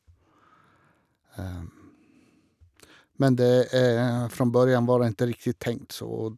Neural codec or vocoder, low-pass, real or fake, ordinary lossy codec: none; 14.4 kHz; real; none